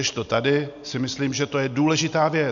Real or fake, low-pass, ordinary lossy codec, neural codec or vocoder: real; 7.2 kHz; MP3, 64 kbps; none